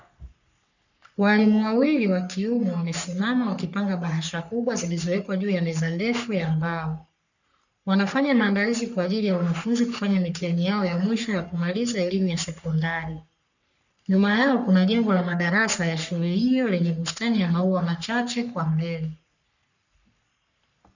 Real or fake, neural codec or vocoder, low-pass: fake; codec, 44.1 kHz, 3.4 kbps, Pupu-Codec; 7.2 kHz